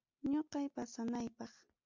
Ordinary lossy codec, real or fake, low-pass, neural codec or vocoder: MP3, 64 kbps; real; 7.2 kHz; none